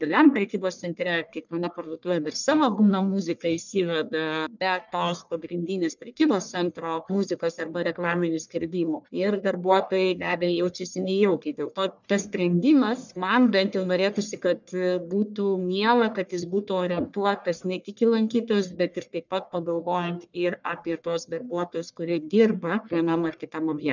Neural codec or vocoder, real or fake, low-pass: codec, 44.1 kHz, 1.7 kbps, Pupu-Codec; fake; 7.2 kHz